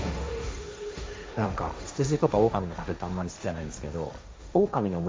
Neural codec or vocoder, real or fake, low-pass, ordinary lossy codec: codec, 16 kHz, 1.1 kbps, Voila-Tokenizer; fake; none; none